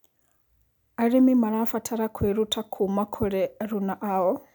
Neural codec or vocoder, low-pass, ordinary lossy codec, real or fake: none; 19.8 kHz; none; real